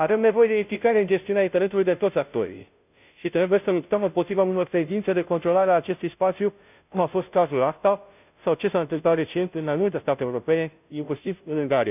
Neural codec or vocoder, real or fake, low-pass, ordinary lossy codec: codec, 16 kHz, 0.5 kbps, FunCodec, trained on Chinese and English, 25 frames a second; fake; 3.6 kHz; none